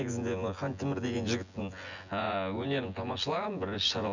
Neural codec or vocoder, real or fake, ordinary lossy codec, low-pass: vocoder, 24 kHz, 100 mel bands, Vocos; fake; none; 7.2 kHz